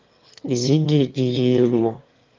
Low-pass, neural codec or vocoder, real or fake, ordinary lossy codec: 7.2 kHz; autoencoder, 22.05 kHz, a latent of 192 numbers a frame, VITS, trained on one speaker; fake; Opus, 24 kbps